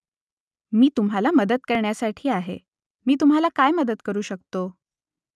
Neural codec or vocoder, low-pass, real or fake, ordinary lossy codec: none; none; real; none